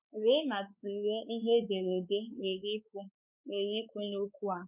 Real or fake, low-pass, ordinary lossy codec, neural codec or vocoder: fake; 3.6 kHz; none; codec, 16 kHz, 4 kbps, X-Codec, WavLM features, trained on Multilingual LibriSpeech